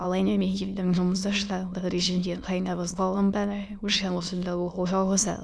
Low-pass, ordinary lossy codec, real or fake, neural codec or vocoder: none; none; fake; autoencoder, 22.05 kHz, a latent of 192 numbers a frame, VITS, trained on many speakers